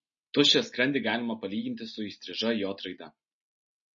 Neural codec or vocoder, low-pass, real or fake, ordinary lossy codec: none; 7.2 kHz; real; MP3, 32 kbps